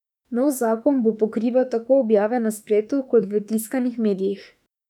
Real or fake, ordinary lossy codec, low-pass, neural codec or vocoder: fake; none; 19.8 kHz; autoencoder, 48 kHz, 32 numbers a frame, DAC-VAE, trained on Japanese speech